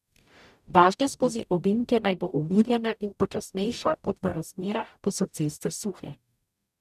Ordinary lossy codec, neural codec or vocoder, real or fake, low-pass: none; codec, 44.1 kHz, 0.9 kbps, DAC; fake; 14.4 kHz